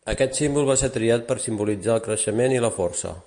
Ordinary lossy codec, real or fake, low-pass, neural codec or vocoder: MP3, 64 kbps; real; 9.9 kHz; none